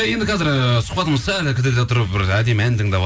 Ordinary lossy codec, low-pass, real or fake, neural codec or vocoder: none; none; real; none